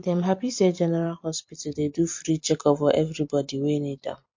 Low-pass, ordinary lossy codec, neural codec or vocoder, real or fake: 7.2 kHz; MP3, 48 kbps; vocoder, 44.1 kHz, 128 mel bands every 256 samples, BigVGAN v2; fake